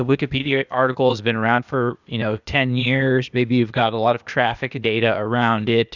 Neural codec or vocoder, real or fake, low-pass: codec, 16 kHz, 0.8 kbps, ZipCodec; fake; 7.2 kHz